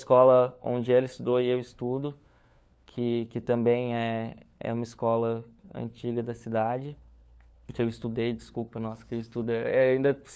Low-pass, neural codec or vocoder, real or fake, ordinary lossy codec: none; codec, 16 kHz, 4 kbps, FunCodec, trained on LibriTTS, 50 frames a second; fake; none